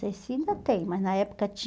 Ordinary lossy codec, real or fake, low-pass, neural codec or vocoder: none; real; none; none